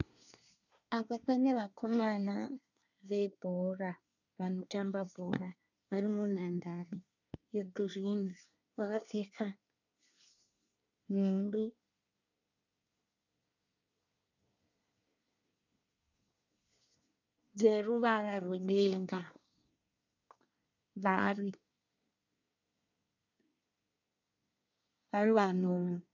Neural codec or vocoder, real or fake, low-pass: codec, 24 kHz, 1 kbps, SNAC; fake; 7.2 kHz